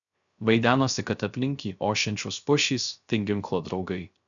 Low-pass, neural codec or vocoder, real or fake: 7.2 kHz; codec, 16 kHz, 0.3 kbps, FocalCodec; fake